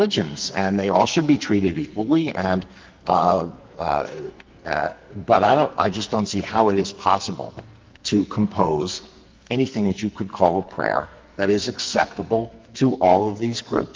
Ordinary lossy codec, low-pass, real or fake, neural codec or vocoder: Opus, 24 kbps; 7.2 kHz; fake; codec, 44.1 kHz, 2.6 kbps, SNAC